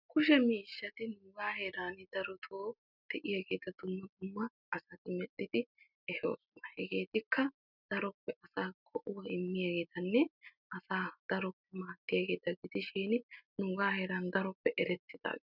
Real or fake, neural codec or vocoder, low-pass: real; none; 5.4 kHz